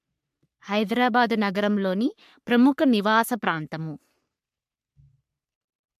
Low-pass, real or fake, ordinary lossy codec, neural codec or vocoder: 14.4 kHz; fake; MP3, 96 kbps; codec, 44.1 kHz, 3.4 kbps, Pupu-Codec